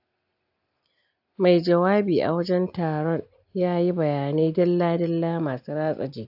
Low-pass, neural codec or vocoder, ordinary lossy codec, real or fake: 5.4 kHz; none; AAC, 48 kbps; real